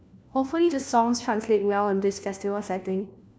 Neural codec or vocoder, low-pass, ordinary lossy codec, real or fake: codec, 16 kHz, 1 kbps, FunCodec, trained on LibriTTS, 50 frames a second; none; none; fake